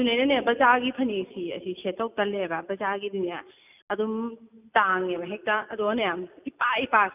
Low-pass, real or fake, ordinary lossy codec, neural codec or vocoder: 3.6 kHz; real; none; none